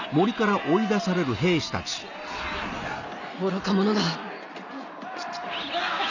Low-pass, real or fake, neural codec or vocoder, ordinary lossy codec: 7.2 kHz; real; none; none